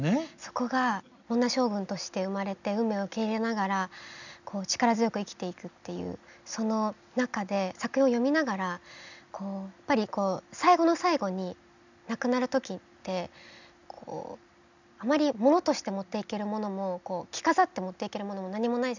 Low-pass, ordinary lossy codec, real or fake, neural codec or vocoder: 7.2 kHz; none; real; none